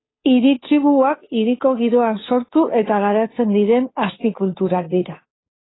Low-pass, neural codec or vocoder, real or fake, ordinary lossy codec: 7.2 kHz; codec, 16 kHz, 2 kbps, FunCodec, trained on Chinese and English, 25 frames a second; fake; AAC, 16 kbps